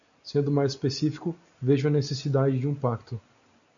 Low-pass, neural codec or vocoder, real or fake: 7.2 kHz; none; real